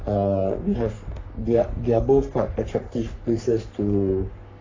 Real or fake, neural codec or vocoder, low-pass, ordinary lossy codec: fake; codec, 44.1 kHz, 3.4 kbps, Pupu-Codec; 7.2 kHz; AAC, 32 kbps